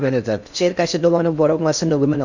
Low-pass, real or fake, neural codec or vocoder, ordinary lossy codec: 7.2 kHz; fake; codec, 16 kHz in and 24 kHz out, 0.8 kbps, FocalCodec, streaming, 65536 codes; none